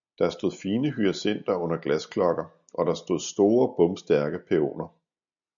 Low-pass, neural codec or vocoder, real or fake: 7.2 kHz; none; real